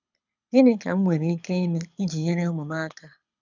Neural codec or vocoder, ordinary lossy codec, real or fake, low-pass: codec, 24 kHz, 6 kbps, HILCodec; none; fake; 7.2 kHz